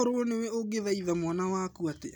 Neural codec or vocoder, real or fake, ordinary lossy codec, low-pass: none; real; none; none